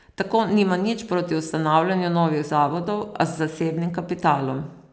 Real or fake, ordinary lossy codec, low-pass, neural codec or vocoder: real; none; none; none